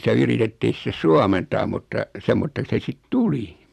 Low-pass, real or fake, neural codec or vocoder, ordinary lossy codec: 14.4 kHz; fake; vocoder, 44.1 kHz, 128 mel bands every 256 samples, BigVGAN v2; MP3, 96 kbps